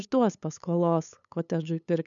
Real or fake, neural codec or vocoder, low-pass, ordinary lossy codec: fake; codec, 16 kHz, 8 kbps, FunCodec, trained on LibriTTS, 25 frames a second; 7.2 kHz; MP3, 96 kbps